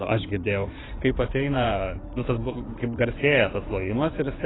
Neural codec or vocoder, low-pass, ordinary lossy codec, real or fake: codec, 16 kHz in and 24 kHz out, 2.2 kbps, FireRedTTS-2 codec; 7.2 kHz; AAC, 16 kbps; fake